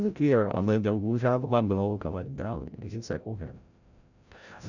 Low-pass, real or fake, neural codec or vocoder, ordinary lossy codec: 7.2 kHz; fake; codec, 16 kHz, 0.5 kbps, FreqCodec, larger model; none